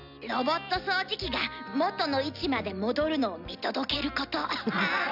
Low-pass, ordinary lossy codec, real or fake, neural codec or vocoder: 5.4 kHz; none; real; none